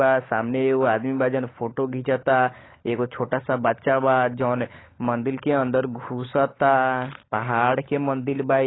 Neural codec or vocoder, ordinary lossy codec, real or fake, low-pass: codec, 16 kHz, 16 kbps, FunCodec, trained on LibriTTS, 50 frames a second; AAC, 16 kbps; fake; 7.2 kHz